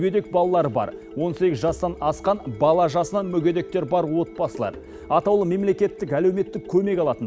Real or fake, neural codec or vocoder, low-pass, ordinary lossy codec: real; none; none; none